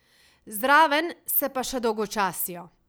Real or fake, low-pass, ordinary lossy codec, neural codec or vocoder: real; none; none; none